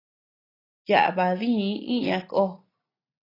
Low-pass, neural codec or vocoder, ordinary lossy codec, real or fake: 5.4 kHz; none; AAC, 24 kbps; real